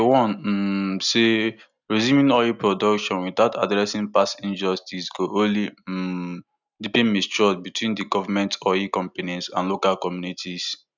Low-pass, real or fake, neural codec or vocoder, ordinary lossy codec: 7.2 kHz; real; none; none